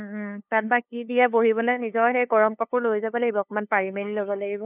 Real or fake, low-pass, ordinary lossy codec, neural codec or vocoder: fake; 3.6 kHz; none; codec, 16 kHz, 2 kbps, FunCodec, trained on LibriTTS, 25 frames a second